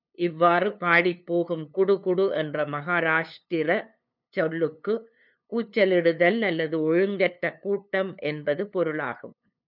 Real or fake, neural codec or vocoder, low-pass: fake; codec, 16 kHz, 2 kbps, FunCodec, trained on LibriTTS, 25 frames a second; 5.4 kHz